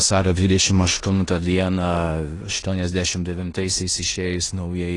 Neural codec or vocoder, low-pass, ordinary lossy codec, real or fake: codec, 16 kHz in and 24 kHz out, 0.9 kbps, LongCat-Audio-Codec, four codebook decoder; 10.8 kHz; AAC, 32 kbps; fake